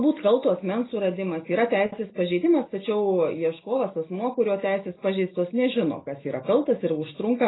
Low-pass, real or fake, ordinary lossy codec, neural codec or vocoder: 7.2 kHz; real; AAC, 16 kbps; none